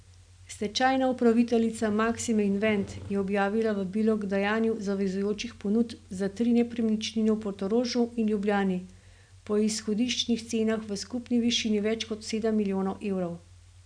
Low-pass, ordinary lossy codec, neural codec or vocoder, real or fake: 9.9 kHz; none; none; real